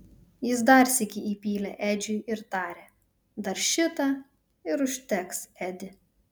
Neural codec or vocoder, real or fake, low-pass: none; real; 19.8 kHz